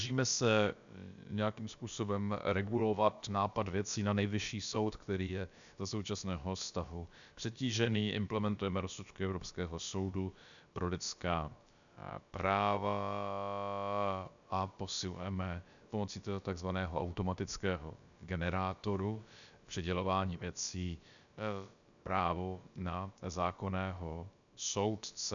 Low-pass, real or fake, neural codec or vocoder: 7.2 kHz; fake; codec, 16 kHz, about 1 kbps, DyCAST, with the encoder's durations